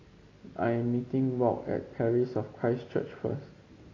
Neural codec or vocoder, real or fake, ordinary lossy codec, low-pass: none; real; AAC, 32 kbps; 7.2 kHz